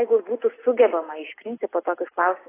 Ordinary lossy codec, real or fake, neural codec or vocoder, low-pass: AAC, 16 kbps; real; none; 3.6 kHz